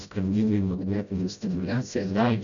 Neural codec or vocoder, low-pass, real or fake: codec, 16 kHz, 0.5 kbps, FreqCodec, smaller model; 7.2 kHz; fake